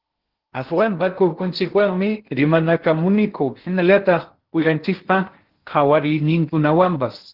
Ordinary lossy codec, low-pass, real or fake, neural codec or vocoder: Opus, 16 kbps; 5.4 kHz; fake; codec, 16 kHz in and 24 kHz out, 0.6 kbps, FocalCodec, streaming, 2048 codes